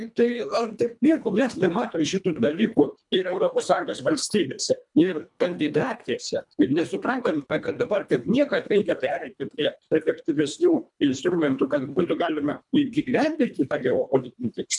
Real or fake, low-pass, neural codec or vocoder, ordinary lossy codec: fake; 10.8 kHz; codec, 24 kHz, 1.5 kbps, HILCodec; MP3, 96 kbps